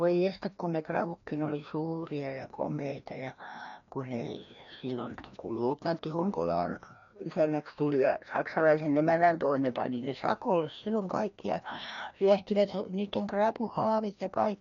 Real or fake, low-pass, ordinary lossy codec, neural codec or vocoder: fake; 7.2 kHz; none; codec, 16 kHz, 1 kbps, FreqCodec, larger model